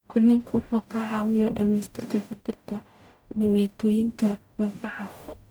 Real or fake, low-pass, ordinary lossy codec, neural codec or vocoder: fake; none; none; codec, 44.1 kHz, 0.9 kbps, DAC